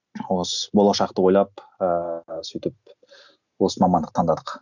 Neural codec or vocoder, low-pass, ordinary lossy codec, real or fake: none; 7.2 kHz; none; real